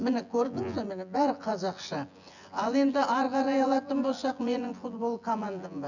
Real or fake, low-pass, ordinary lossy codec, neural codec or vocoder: fake; 7.2 kHz; none; vocoder, 24 kHz, 100 mel bands, Vocos